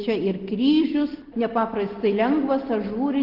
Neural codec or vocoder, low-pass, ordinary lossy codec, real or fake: none; 5.4 kHz; Opus, 16 kbps; real